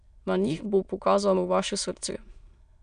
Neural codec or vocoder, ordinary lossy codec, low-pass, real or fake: autoencoder, 22.05 kHz, a latent of 192 numbers a frame, VITS, trained on many speakers; none; 9.9 kHz; fake